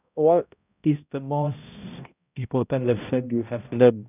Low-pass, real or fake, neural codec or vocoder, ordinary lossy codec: 3.6 kHz; fake; codec, 16 kHz, 0.5 kbps, X-Codec, HuBERT features, trained on balanced general audio; none